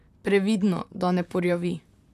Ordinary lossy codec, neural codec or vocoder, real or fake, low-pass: none; vocoder, 44.1 kHz, 128 mel bands, Pupu-Vocoder; fake; 14.4 kHz